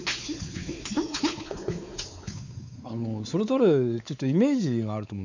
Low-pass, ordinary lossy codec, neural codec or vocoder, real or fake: 7.2 kHz; none; codec, 16 kHz, 4 kbps, X-Codec, WavLM features, trained on Multilingual LibriSpeech; fake